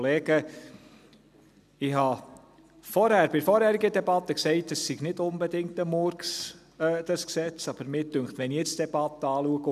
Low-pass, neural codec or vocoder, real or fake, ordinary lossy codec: 14.4 kHz; none; real; none